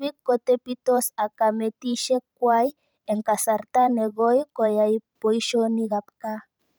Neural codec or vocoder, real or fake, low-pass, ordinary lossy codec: vocoder, 44.1 kHz, 128 mel bands every 512 samples, BigVGAN v2; fake; none; none